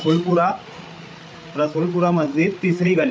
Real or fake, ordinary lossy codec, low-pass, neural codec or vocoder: fake; none; none; codec, 16 kHz, 8 kbps, FreqCodec, larger model